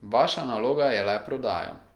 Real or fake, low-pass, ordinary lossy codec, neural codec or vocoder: real; 19.8 kHz; Opus, 24 kbps; none